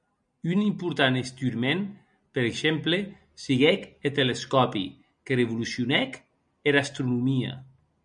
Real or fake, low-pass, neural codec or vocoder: real; 9.9 kHz; none